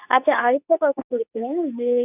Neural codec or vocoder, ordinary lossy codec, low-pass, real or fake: codec, 24 kHz, 0.9 kbps, WavTokenizer, medium speech release version 2; none; 3.6 kHz; fake